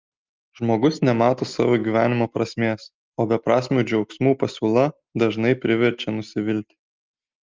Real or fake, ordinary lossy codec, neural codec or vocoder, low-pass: real; Opus, 32 kbps; none; 7.2 kHz